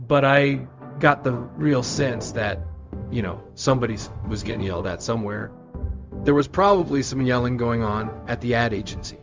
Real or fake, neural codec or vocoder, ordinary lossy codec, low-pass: fake; codec, 16 kHz, 0.4 kbps, LongCat-Audio-Codec; Opus, 32 kbps; 7.2 kHz